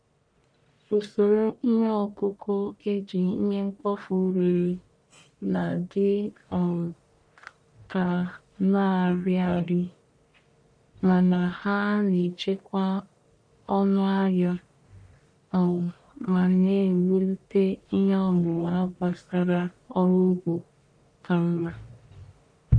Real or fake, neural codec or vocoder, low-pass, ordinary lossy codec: fake; codec, 44.1 kHz, 1.7 kbps, Pupu-Codec; 9.9 kHz; none